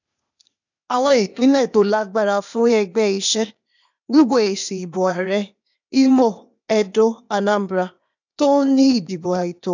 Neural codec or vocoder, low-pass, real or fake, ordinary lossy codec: codec, 16 kHz, 0.8 kbps, ZipCodec; 7.2 kHz; fake; none